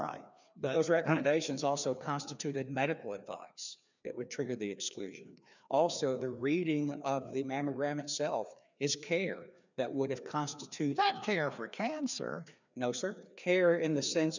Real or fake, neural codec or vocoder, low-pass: fake; codec, 16 kHz, 2 kbps, FreqCodec, larger model; 7.2 kHz